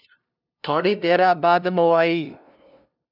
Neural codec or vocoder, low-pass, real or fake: codec, 16 kHz, 0.5 kbps, FunCodec, trained on LibriTTS, 25 frames a second; 5.4 kHz; fake